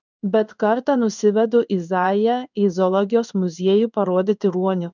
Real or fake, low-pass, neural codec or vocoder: fake; 7.2 kHz; codec, 16 kHz in and 24 kHz out, 1 kbps, XY-Tokenizer